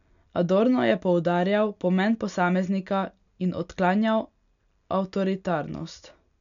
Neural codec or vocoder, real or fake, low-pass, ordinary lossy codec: none; real; 7.2 kHz; none